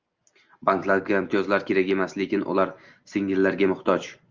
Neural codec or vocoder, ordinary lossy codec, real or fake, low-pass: none; Opus, 32 kbps; real; 7.2 kHz